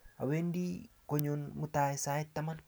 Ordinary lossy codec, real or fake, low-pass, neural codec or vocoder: none; real; none; none